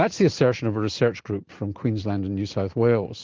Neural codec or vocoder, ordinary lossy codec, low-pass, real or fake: none; Opus, 16 kbps; 7.2 kHz; real